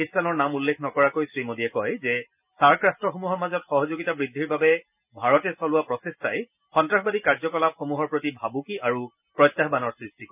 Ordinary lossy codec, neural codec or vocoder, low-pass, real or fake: none; none; 3.6 kHz; real